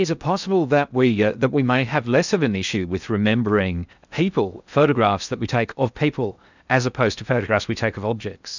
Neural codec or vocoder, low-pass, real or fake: codec, 16 kHz in and 24 kHz out, 0.6 kbps, FocalCodec, streaming, 2048 codes; 7.2 kHz; fake